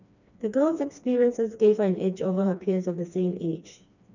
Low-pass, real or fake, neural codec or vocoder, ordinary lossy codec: 7.2 kHz; fake; codec, 16 kHz, 2 kbps, FreqCodec, smaller model; none